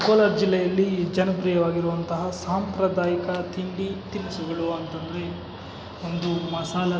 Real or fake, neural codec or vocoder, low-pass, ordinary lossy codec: real; none; none; none